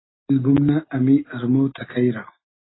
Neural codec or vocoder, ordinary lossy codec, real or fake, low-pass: none; AAC, 16 kbps; real; 7.2 kHz